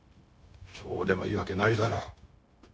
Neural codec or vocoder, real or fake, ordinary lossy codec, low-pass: codec, 16 kHz, 0.9 kbps, LongCat-Audio-Codec; fake; none; none